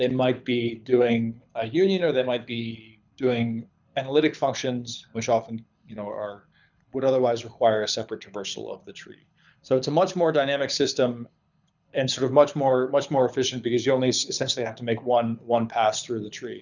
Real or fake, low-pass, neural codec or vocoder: fake; 7.2 kHz; codec, 24 kHz, 6 kbps, HILCodec